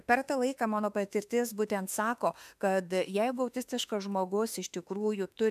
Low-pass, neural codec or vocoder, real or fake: 14.4 kHz; autoencoder, 48 kHz, 32 numbers a frame, DAC-VAE, trained on Japanese speech; fake